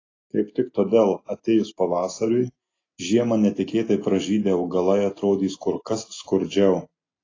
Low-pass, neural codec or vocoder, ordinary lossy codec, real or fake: 7.2 kHz; none; AAC, 32 kbps; real